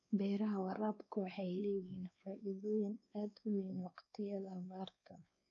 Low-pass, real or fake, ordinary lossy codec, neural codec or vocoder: 7.2 kHz; fake; none; codec, 16 kHz, 2 kbps, X-Codec, WavLM features, trained on Multilingual LibriSpeech